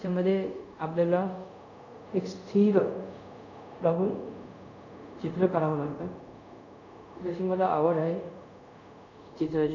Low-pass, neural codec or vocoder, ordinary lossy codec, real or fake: 7.2 kHz; codec, 24 kHz, 0.5 kbps, DualCodec; none; fake